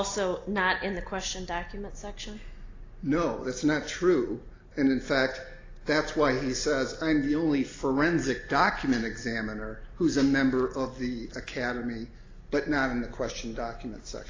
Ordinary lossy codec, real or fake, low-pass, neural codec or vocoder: AAC, 32 kbps; real; 7.2 kHz; none